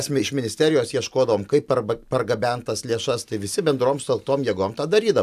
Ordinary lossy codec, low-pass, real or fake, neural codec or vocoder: AAC, 96 kbps; 14.4 kHz; real; none